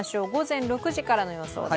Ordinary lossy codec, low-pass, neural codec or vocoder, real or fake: none; none; none; real